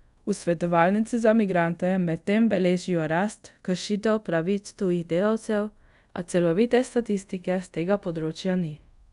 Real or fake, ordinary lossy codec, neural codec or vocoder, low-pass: fake; none; codec, 24 kHz, 0.5 kbps, DualCodec; 10.8 kHz